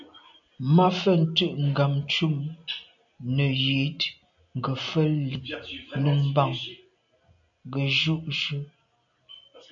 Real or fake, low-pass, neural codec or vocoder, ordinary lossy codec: real; 7.2 kHz; none; AAC, 64 kbps